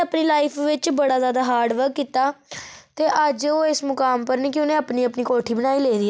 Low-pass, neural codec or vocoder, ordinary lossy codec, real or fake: none; none; none; real